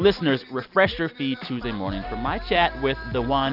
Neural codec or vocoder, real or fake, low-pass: none; real; 5.4 kHz